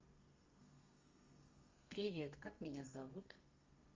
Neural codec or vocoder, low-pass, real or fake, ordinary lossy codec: codec, 32 kHz, 1.9 kbps, SNAC; 7.2 kHz; fake; Opus, 32 kbps